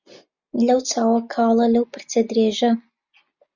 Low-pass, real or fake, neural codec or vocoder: 7.2 kHz; real; none